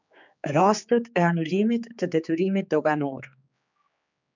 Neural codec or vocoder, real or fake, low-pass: codec, 16 kHz, 4 kbps, X-Codec, HuBERT features, trained on general audio; fake; 7.2 kHz